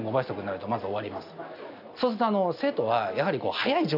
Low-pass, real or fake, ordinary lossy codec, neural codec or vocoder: 5.4 kHz; real; none; none